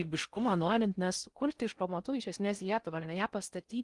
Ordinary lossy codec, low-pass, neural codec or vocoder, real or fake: Opus, 24 kbps; 10.8 kHz; codec, 16 kHz in and 24 kHz out, 0.6 kbps, FocalCodec, streaming, 4096 codes; fake